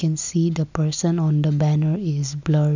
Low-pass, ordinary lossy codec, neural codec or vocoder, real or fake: 7.2 kHz; none; none; real